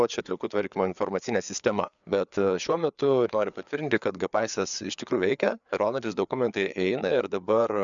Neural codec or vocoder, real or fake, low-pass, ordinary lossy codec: codec, 16 kHz, 4 kbps, FreqCodec, larger model; fake; 7.2 kHz; MP3, 96 kbps